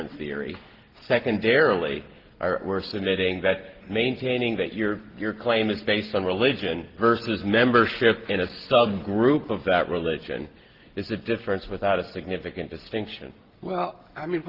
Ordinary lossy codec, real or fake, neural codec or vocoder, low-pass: Opus, 16 kbps; real; none; 5.4 kHz